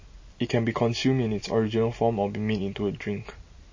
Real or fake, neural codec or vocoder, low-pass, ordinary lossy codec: real; none; 7.2 kHz; MP3, 32 kbps